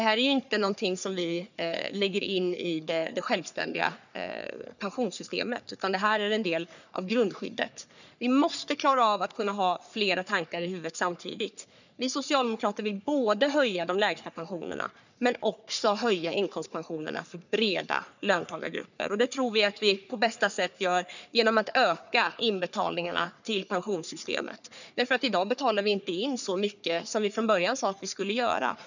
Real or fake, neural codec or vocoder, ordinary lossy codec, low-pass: fake; codec, 44.1 kHz, 3.4 kbps, Pupu-Codec; none; 7.2 kHz